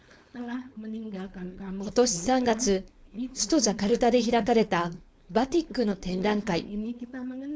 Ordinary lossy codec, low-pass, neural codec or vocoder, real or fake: none; none; codec, 16 kHz, 4.8 kbps, FACodec; fake